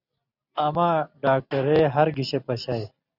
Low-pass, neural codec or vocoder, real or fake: 5.4 kHz; none; real